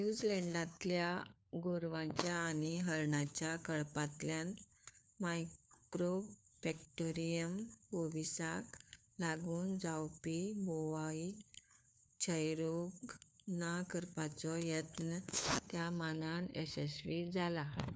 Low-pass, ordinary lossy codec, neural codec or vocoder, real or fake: none; none; codec, 16 kHz, 4 kbps, FunCodec, trained on LibriTTS, 50 frames a second; fake